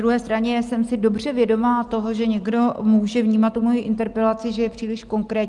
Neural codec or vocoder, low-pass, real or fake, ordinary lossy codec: none; 10.8 kHz; real; Opus, 24 kbps